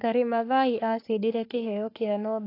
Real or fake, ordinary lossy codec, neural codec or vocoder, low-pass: fake; AAC, 32 kbps; codec, 44.1 kHz, 3.4 kbps, Pupu-Codec; 5.4 kHz